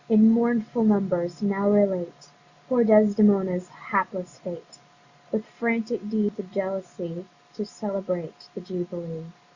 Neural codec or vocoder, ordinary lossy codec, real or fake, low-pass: none; Opus, 64 kbps; real; 7.2 kHz